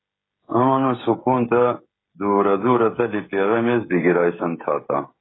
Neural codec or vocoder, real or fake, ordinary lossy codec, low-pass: codec, 16 kHz, 16 kbps, FreqCodec, smaller model; fake; AAC, 16 kbps; 7.2 kHz